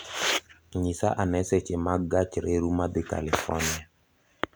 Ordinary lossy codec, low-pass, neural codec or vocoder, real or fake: none; none; none; real